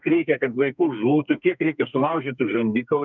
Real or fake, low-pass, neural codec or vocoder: fake; 7.2 kHz; codec, 32 kHz, 1.9 kbps, SNAC